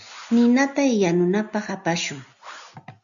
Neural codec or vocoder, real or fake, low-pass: none; real; 7.2 kHz